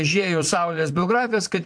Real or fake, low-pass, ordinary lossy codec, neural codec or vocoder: fake; 9.9 kHz; MP3, 64 kbps; vocoder, 22.05 kHz, 80 mel bands, Vocos